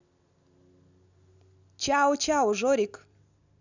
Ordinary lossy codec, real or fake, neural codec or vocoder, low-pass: none; real; none; 7.2 kHz